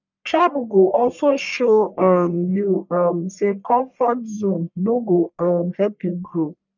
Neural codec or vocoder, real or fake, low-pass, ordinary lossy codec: codec, 44.1 kHz, 1.7 kbps, Pupu-Codec; fake; 7.2 kHz; none